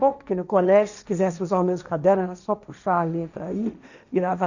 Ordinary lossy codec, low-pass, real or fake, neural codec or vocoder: none; 7.2 kHz; fake; codec, 16 kHz, 1.1 kbps, Voila-Tokenizer